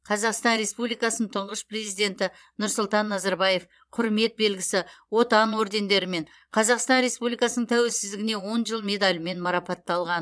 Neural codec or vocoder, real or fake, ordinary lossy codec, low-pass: vocoder, 22.05 kHz, 80 mel bands, Vocos; fake; none; none